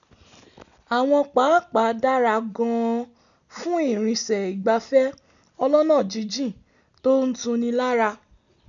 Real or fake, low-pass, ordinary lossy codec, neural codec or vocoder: real; 7.2 kHz; none; none